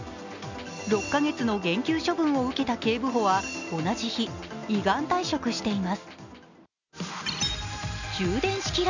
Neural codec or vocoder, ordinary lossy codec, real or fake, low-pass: none; none; real; 7.2 kHz